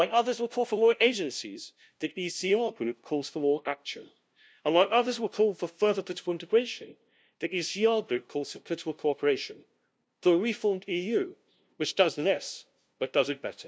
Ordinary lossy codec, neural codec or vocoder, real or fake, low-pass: none; codec, 16 kHz, 0.5 kbps, FunCodec, trained on LibriTTS, 25 frames a second; fake; none